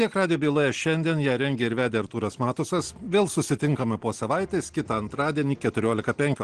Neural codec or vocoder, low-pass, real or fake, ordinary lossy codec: none; 9.9 kHz; real; Opus, 16 kbps